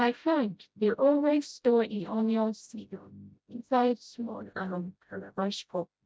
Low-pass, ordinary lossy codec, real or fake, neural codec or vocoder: none; none; fake; codec, 16 kHz, 0.5 kbps, FreqCodec, smaller model